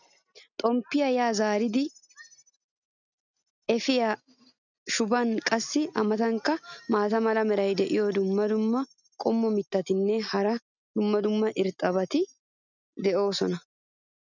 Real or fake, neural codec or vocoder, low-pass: real; none; 7.2 kHz